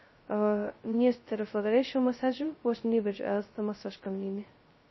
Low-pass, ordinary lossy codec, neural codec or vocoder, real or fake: 7.2 kHz; MP3, 24 kbps; codec, 16 kHz, 0.2 kbps, FocalCodec; fake